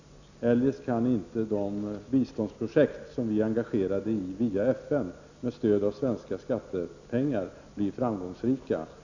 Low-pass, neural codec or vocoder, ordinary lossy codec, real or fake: 7.2 kHz; none; none; real